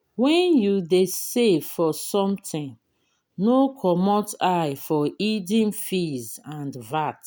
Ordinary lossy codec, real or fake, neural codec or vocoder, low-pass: none; real; none; none